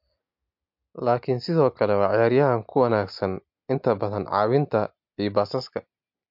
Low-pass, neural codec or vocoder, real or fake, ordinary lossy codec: 5.4 kHz; none; real; MP3, 48 kbps